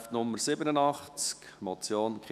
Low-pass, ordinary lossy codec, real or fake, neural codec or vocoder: 14.4 kHz; none; fake; autoencoder, 48 kHz, 128 numbers a frame, DAC-VAE, trained on Japanese speech